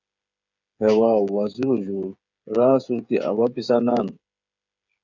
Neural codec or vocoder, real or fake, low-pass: codec, 16 kHz, 8 kbps, FreqCodec, smaller model; fake; 7.2 kHz